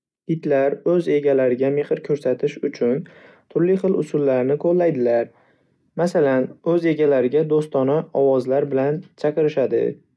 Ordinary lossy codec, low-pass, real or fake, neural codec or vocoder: none; none; real; none